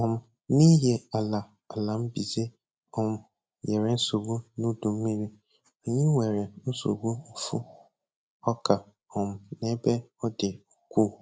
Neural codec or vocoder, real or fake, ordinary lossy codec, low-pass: none; real; none; none